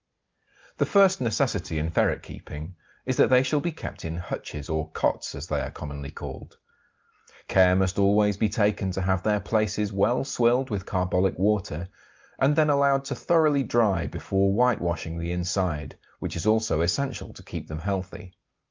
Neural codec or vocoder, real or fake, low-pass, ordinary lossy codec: none; real; 7.2 kHz; Opus, 32 kbps